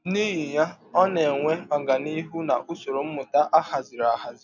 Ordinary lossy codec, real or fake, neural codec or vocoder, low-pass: none; real; none; 7.2 kHz